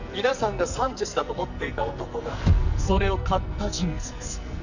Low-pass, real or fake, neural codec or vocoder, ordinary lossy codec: 7.2 kHz; fake; codec, 44.1 kHz, 2.6 kbps, SNAC; none